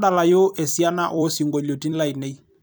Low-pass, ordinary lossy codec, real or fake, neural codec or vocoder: none; none; fake; vocoder, 44.1 kHz, 128 mel bands every 256 samples, BigVGAN v2